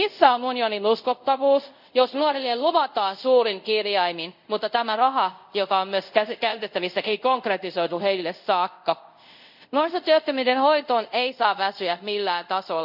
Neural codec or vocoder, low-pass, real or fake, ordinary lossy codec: codec, 24 kHz, 0.5 kbps, DualCodec; 5.4 kHz; fake; none